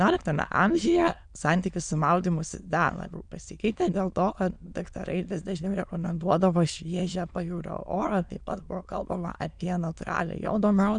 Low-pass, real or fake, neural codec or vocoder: 9.9 kHz; fake; autoencoder, 22.05 kHz, a latent of 192 numbers a frame, VITS, trained on many speakers